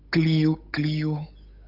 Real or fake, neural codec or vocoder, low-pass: fake; codec, 16 kHz, 8 kbps, FunCodec, trained on Chinese and English, 25 frames a second; 5.4 kHz